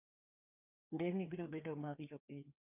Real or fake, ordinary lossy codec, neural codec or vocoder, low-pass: fake; AAC, 32 kbps; codec, 16 kHz, 2 kbps, FreqCodec, larger model; 3.6 kHz